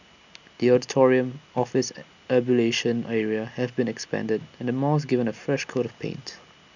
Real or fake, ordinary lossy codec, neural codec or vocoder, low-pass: real; none; none; 7.2 kHz